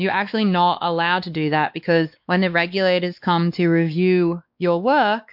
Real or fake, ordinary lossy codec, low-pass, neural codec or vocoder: fake; MP3, 48 kbps; 5.4 kHz; codec, 16 kHz, 2 kbps, X-Codec, WavLM features, trained on Multilingual LibriSpeech